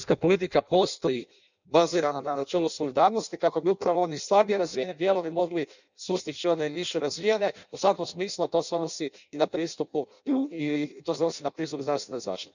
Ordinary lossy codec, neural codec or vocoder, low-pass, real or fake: none; codec, 16 kHz in and 24 kHz out, 0.6 kbps, FireRedTTS-2 codec; 7.2 kHz; fake